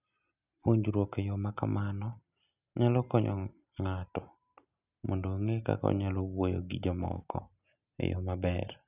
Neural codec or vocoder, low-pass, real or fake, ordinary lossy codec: none; 3.6 kHz; real; none